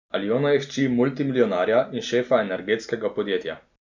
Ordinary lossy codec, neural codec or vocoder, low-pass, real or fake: none; none; 7.2 kHz; real